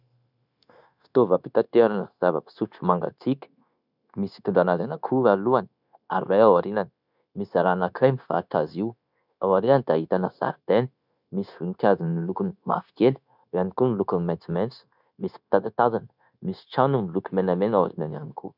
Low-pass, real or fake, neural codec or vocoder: 5.4 kHz; fake; codec, 16 kHz, 0.9 kbps, LongCat-Audio-Codec